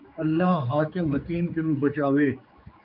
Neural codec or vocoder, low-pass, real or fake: codec, 16 kHz, 4 kbps, X-Codec, HuBERT features, trained on general audio; 5.4 kHz; fake